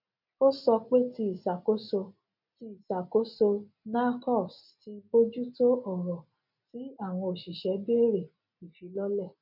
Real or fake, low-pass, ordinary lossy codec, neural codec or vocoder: real; 5.4 kHz; none; none